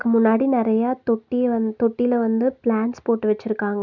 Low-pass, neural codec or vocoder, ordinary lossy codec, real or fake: 7.2 kHz; none; none; real